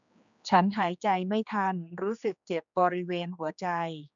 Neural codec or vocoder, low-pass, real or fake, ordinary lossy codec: codec, 16 kHz, 2 kbps, X-Codec, HuBERT features, trained on general audio; 7.2 kHz; fake; none